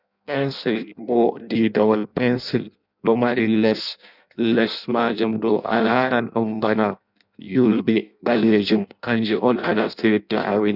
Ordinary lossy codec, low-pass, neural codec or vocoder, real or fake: none; 5.4 kHz; codec, 16 kHz in and 24 kHz out, 0.6 kbps, FireRedTTS-2 codec; fake